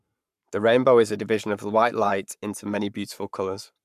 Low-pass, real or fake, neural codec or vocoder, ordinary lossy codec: 14.4 kHz; fake; codec, 44.1 kHz, 7.8 kbps, Pupu-Codec; MP3, 96 kbps